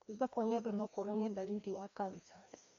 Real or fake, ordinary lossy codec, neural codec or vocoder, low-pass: fake; MP3, 48 kbps; codec, 16 kHz, 1 kbps, FreqCodec, larger model; 7.2 kHz